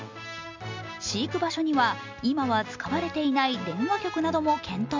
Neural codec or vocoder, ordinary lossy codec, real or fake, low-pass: none; MP3, 64 kbps; real; 7.2 kHz